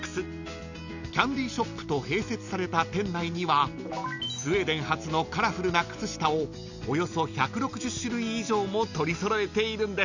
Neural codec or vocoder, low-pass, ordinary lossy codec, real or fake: none; 7.2 kHz; none; real